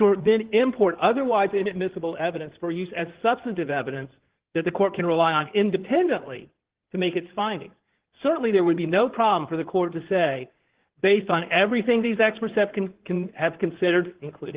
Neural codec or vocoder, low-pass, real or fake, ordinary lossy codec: codec, 16 kHz in and 24 kHz out, 2.2 kbps, FireRedTTS-2 codec; 3.6 kHz; fake; Opus, 16 kbps